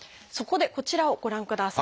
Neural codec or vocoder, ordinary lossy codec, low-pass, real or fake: none; none; none; real